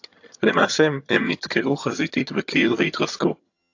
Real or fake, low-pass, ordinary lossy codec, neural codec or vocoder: fake; 7.2 kHz; AAC, 48 kbps; vocoder, 22.05 kHz, 80 mel bands, HiFi-GAN